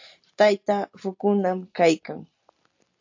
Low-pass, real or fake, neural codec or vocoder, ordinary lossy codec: 7.2 kHz; real; none; AAC, 48 kbps